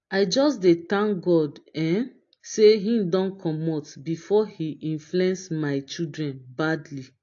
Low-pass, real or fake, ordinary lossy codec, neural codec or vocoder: 7.2 kHz; real; AAC, 48 kbps; none